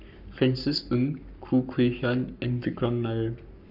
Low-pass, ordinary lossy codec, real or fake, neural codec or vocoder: 5.4 kHz; none; fake; codec, 44.1 kHz, 7.8 kbps, Pupu-Codec